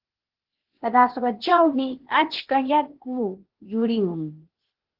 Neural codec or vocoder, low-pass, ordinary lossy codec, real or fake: codec, 16 kHz, 0.8 kbps, ZipCodec; 5.4 kHz; Opus, 16 kbps; fake